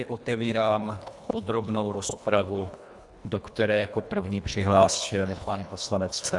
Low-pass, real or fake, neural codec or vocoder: 10.8 kHz; fake; codec, 24 kHz, 1.5 kbps, HILCodec